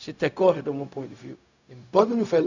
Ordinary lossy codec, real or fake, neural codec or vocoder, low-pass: none; fake; codec, 16 kHz, 0.4 kbps, LongCat-Audio-Codec; 7.2 kHz